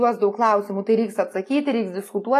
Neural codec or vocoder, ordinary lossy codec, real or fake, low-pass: none; AAC, 48 kbps; real; 14.4 kHz